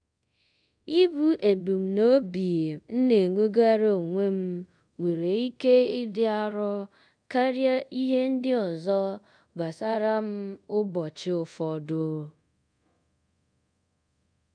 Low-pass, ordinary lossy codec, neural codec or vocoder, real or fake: 9.9 kHz; none; codec, 24 kHz, 0.5 kbps, DualCodec; fake